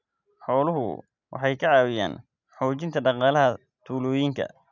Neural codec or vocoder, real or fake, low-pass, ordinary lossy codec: none; real; 7.2 kHz; none